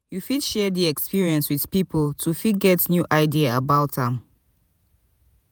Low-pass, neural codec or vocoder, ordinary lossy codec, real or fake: none; vocoder, 48 kHz, 128 mel bands, Vocos; none; fake